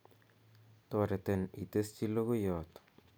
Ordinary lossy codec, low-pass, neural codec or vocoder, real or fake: none; none; none; real